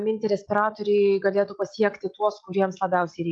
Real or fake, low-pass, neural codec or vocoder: real; 9.9 kHz; none